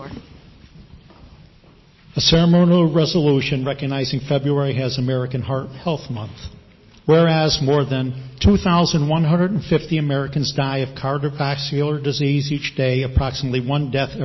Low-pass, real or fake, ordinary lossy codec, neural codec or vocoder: 7.2 kHz; real; MP3, 24 kbps; none